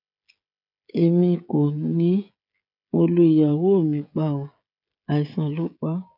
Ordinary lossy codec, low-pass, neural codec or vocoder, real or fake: none; 5.4 kHz; codec, 16 kHz, 16 kbps, FreqCodec, smaller model; fake